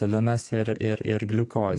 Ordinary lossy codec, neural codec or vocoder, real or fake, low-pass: AAC, 48 kbps; codec, 32 kHz, 1.9 kbps, SNAC; fake; 10.8 kHz